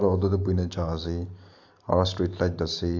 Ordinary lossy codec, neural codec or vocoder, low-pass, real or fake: AAC, 48 kbps; none; 7.2 kHz; real